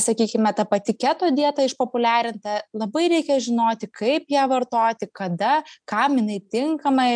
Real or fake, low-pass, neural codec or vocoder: real; 9.9 kHz; none